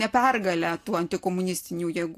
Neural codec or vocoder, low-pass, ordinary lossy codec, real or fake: none; 14.4 kHz; AAC, 64 kbps; real